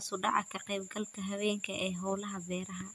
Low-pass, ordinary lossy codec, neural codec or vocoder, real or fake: 14.4 kHz; none; none; real